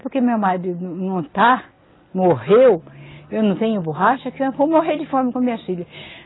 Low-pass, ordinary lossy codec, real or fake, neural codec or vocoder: 7.2 kHz; AAC, 16 kbps; real; none